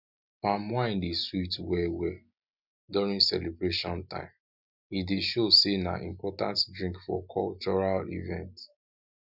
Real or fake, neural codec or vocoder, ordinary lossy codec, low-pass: real; none; none; 5.4 kHz